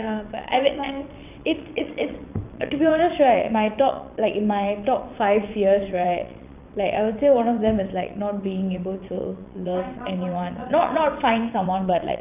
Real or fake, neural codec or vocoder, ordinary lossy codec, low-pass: fake; vocoder, 22.05 kHz, 80 mel bands, WaveNeXt; none; 3.6 kHz